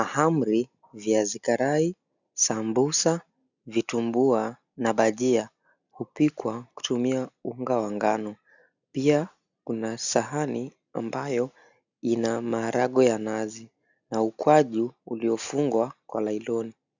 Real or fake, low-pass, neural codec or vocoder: real; 7.2 kHz; none